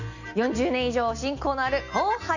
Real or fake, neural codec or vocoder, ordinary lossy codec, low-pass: real; none; none; 7.2 kHz